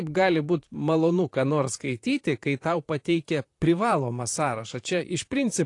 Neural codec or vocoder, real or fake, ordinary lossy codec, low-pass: vocoder, 44.1 kHz, 128 mel bands, Pupu-Vocoder; fake; AAC, 48 kbps; 10.8 kHz